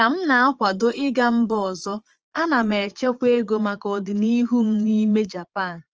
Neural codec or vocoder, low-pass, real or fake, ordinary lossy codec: vocoder, 22.05 kHz, 80 mel bands, Vocos; 7.2 kHz; fake; Opus, 24 kbps